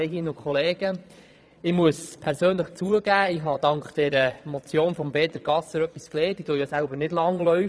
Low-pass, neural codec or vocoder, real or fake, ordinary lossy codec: none; vocoder, 22.05 kHz, 80 mel bands, Vocos; fake; none